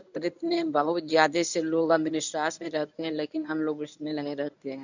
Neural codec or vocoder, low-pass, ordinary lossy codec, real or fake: codec, 24 kHz, 0.9 kbps, WavTokenizer, medium speech release version 1; 7.2 kHz; none; fake